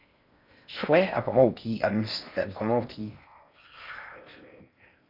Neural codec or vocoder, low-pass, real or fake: codec, 16 kHz in and 24 kHz out, 0.6 kbps, FocalCodec, streaming, 4096 codes; 5.4 kHz; fake